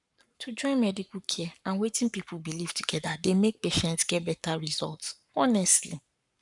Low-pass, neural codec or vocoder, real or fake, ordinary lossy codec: 10.8 kHz; codec, 44.1 kHz, 7.8 kbps, Pupu-Codec; fake; none